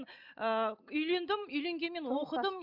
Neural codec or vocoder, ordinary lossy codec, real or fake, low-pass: none; none; real; 5.4 kHz